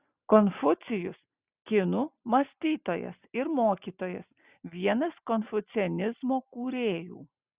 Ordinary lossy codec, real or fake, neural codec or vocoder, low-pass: Opus, 64 kbps; real; none; 3.6 kHz